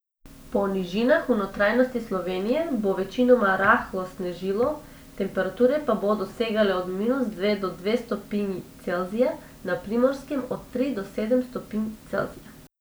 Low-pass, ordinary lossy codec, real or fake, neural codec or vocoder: none; none; real; none